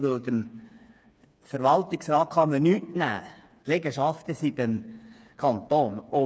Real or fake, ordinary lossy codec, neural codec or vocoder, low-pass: fake; none; codec, 16 kHz, 4 kbps, FreqCodec, smaller model; none